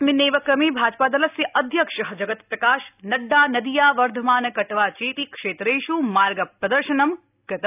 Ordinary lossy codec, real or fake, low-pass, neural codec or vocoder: none; real; 3.6 kHz; none